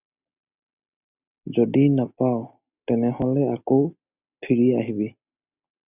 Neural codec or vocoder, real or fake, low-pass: none; real; 3.6 kHz